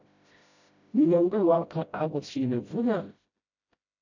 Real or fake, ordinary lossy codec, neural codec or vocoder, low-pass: fake; AAC, 48 kbps; codec, 16 kHz, 0.5 kbps, FreqCodec, smaller model; 7.2 kHz